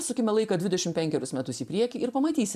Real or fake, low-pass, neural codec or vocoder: fake; 14.4 kHz; vocoder, 44.1 kHz, 128 mel bands every 512 samples, BigVGAN v2